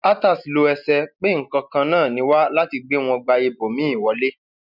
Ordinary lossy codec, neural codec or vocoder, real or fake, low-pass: none; none; real; 5.4 kHz